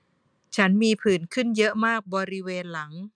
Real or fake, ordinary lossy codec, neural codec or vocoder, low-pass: real; none; none; 9.9 kHz